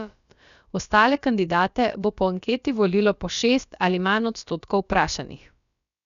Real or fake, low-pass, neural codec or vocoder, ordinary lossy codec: fake; 7.2 kHz; codec, 16 kHz, about 1 kbps, DyCAST, with the encoder's durations; none